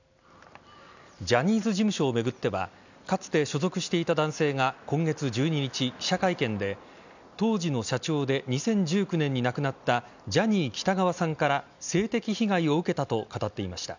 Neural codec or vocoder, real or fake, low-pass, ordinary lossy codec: none; real; 7.2 kHz; none